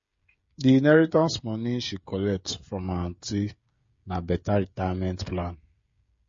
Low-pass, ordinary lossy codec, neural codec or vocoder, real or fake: 7.2 kHz; MP3, 32 kbps; codec, 16 kHz, 16 kbps, FreqCodec, smaller model; fake